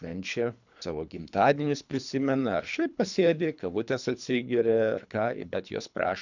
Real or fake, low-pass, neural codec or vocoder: fake; 7.2 kHz; codec, 24 kHz, 3 kbps, HILCodec